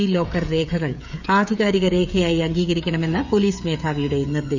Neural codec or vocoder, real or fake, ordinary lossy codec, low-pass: codec, 16 kHz, 16 kbps, FreqCodec, smaller model; fake; none; 7.2 kHz